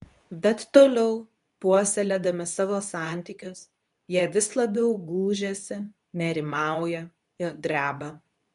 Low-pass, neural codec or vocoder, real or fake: 10.8 kHz; codec, 24 kHz, 0.9 kbps, WavTokenizer, medium speech release version 2; fake